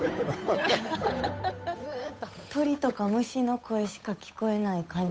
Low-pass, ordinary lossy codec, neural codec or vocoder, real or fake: none; none; codec, 16 kHz, 8 kbps, FunCodec, trained on Chinese and English, 25 frames a second; fake